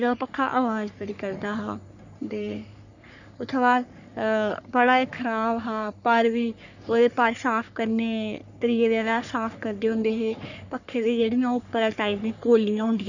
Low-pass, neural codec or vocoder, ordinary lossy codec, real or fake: 7.2 kHz; codec, 44.1 kHz, 3.4 kbps, Pupu-Codec; none; fake